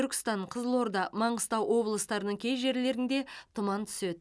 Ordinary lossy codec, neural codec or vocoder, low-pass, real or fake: none; none; none; real